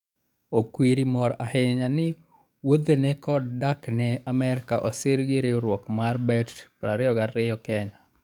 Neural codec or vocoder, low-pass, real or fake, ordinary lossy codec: codec, 44.1 kHz, 7.8 kbps, DAC; 19.8 kHz; fake; none